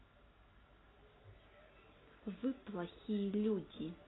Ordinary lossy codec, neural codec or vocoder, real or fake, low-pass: AAC, 16 kbps; none; real; 7.2 kHz